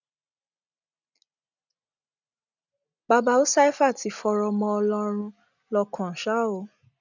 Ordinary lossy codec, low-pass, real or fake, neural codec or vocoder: none; 7.2 kHz; real; none